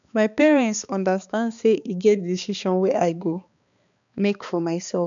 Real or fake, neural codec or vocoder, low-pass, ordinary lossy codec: fake; codec, 16 kHz, 2 kbps, X-Codec, HuBERT features, trained on balanced general audio; 7.2 kHz; none